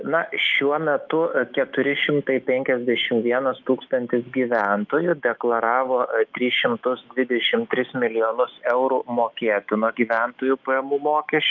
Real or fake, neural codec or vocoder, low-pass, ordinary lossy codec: fake; autoencoder, 48 kHz, 128 numbers a frame, DAC-VAE, trained on Japanese speech; 7.2 kHz; Opus, 24 kbps